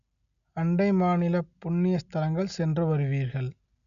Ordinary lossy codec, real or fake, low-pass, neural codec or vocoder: none; real; 7.2 kHz; none